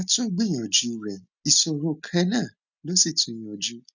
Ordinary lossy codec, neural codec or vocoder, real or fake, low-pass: none; none; real; 7.2 kHz